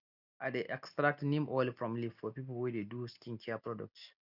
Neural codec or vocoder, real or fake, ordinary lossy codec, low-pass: none; real; none; 5.4 kHz